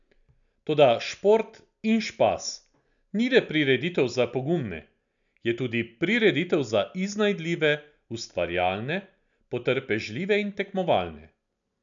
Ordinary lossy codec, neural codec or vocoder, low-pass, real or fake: none; none; 7.2 kHz; real